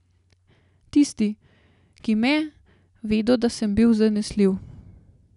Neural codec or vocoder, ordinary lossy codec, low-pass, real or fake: none; none; 10.8 kHz; real